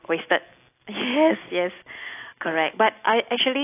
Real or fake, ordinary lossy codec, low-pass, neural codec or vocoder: real; AAC, 24 kbps; 3.6 kHz; none